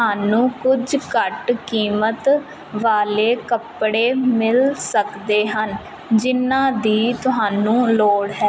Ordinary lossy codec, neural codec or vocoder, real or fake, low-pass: none; none; real; none